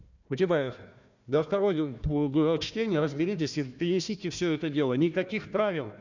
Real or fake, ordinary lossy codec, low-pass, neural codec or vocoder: fake; none; 7.2 kHz; codec, 16 kHz, 1 kbps, FunCodec, trained on Chinese and English, 50 frames a second